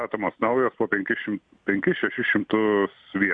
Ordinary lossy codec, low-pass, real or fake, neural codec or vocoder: AAC, 64 kbps; 9.9 kHz; real; none